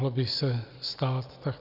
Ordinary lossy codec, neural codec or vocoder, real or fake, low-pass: Opus, 64 kbps; none; real; 5.4 kHz